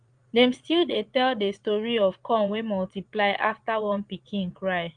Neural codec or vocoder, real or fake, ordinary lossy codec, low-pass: vocoder, 22.05 kHz, 80 mel bands, Vocos; fake; Opus, 32 kbps; 9.9 kHz